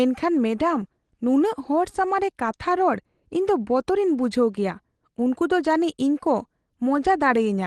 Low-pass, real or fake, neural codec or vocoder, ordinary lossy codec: 10.8 kHz; real; none; Opus, 16 kbps